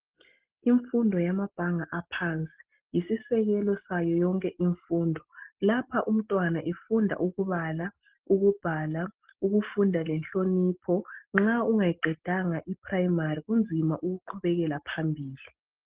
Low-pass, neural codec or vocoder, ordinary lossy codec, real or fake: 3.6 kHz; none; Opus, 16 kbps; real